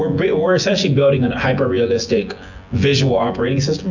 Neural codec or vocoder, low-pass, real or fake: vocoder, 24 kHz, 100 mel bands, Vocos; 7.2 kHz; fake